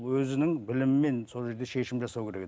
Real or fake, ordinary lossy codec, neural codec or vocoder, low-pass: real; none; none; none